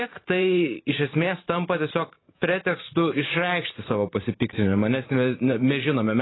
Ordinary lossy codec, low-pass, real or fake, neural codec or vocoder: AAC, 16 kbps; 7.2 kHz; fake; vocoder, 44.1 kHz, 128 mel bands every 512 samples, BigVGAN v2